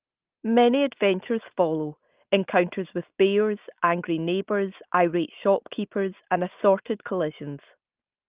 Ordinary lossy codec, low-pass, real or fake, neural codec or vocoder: Opus, 32 kbps; 3.6 kHz; real; none